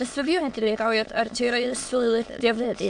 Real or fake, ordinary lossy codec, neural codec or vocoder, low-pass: fake; AAC, 64 kbps; autoencoder, 22.05 kHz, a latent of 192 numbers a frame, VITS, trained on many speakers; 9.9 kHz